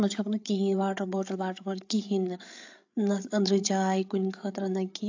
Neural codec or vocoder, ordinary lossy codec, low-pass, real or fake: codec, 16 kHz, 8 kbps, FreqCodec, larger model; AAC, 48 kbps; 7.2 kHz; fake